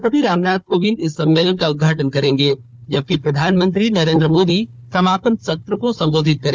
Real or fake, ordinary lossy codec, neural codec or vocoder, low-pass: fake; none; codec, 16 kHz, 4 kbps, FunCodec, trained on Chinese and English, 50 frames a second; none